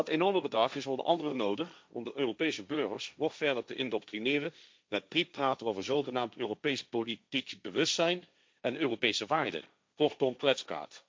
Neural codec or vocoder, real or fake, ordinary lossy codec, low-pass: codec, 16 kHz, 1.1 kbps, Voila-Tokenizer; fake; none; none